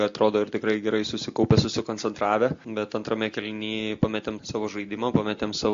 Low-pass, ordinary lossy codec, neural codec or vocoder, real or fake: 7.2 kHz; MP3, 48 kbps; none; real